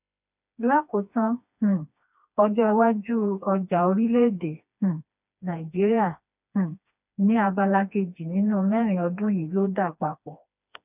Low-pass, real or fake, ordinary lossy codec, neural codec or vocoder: 3.6 kHz; fake; none; codec, 16 kHz, 2 kbps, FreqCodec, smaller model